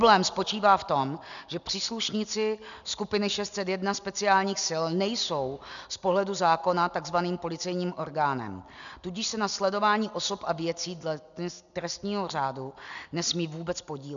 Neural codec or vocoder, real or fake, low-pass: none; real; 7.2 kHz